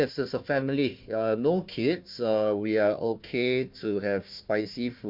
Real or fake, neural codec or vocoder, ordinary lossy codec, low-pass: fake; codec, 16 kHz, 1 kbps, FunCodec, trained on Chinese and English, 50 frames a second; MP3, 48 kbps; 5.4 kHz